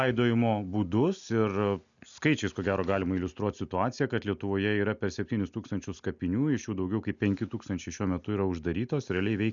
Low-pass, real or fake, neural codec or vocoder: 7.2 kHz; real; none